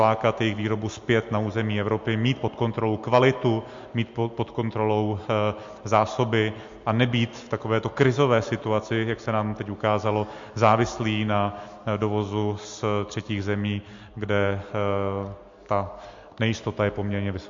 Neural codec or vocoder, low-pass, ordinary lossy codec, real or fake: none; 7.2 kHz; MP3, 48 kbps; real